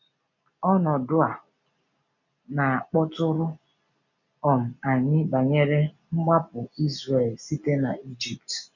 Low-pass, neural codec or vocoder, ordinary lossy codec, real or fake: 7.2 kHz; none; none; real